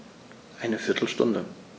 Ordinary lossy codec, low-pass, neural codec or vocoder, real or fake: none; none; none; real